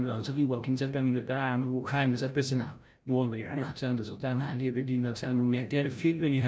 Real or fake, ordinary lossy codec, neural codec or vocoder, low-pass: fake; none; codec, 16 kHz, 0.5 kbps, FreqCodec, larger model; none